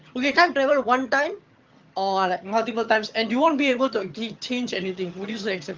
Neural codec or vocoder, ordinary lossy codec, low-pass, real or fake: vocoder, 22.05 kHz, 80 mel bands, HiFi-GAN; Opus, 24 kbps; 7.2 kHz; fake